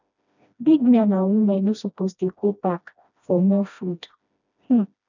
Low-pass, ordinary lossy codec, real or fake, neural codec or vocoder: 7.2 kHz; none; fake; codec, 16 kHz, 1 kbps, FreqCodec, smaller model